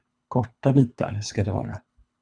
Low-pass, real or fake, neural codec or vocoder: 9.9 kHz; fake; codec, 24 kHz, 3 kbps, HILCodec